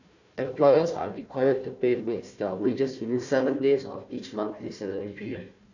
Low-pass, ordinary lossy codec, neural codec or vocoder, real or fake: 7.2 kHz; none; codec, 16 kHz, 1 kbps, FunCodec, trained on Chinese and English, 50 frames a second; fake